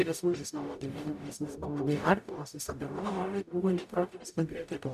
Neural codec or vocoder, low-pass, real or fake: codec, 44.1 kHz, 0.9 kbps, DAC; 14.4 kHz; fake